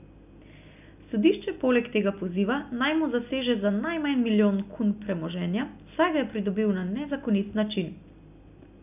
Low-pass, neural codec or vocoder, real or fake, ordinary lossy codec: 3.6 kHz; none; real; none